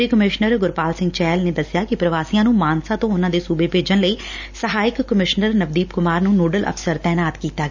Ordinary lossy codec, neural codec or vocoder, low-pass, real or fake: none; none; 7.2 kHz; real